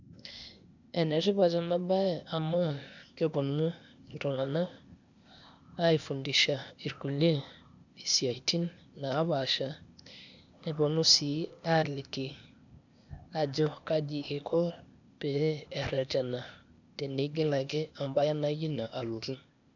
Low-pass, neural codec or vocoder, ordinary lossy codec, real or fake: 7.2 kHz; codec, 16 kHz, 0.8 kbps, ZipCodec; none; fake